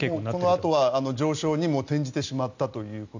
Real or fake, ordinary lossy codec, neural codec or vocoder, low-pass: real; none; none; 7.2 kHz